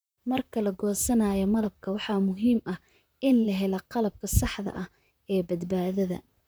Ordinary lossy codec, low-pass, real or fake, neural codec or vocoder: none; none; fake; vocoder, 44.1 kHz, 128 mel bands, Pupu-Vocoder